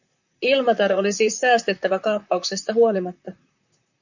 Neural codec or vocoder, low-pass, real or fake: vocoder, 44.1 kHz, 128 mel bands, Pupu-Vocoder; 7.2 kHz; fake